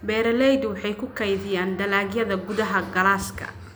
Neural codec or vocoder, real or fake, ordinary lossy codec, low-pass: none; real; none; none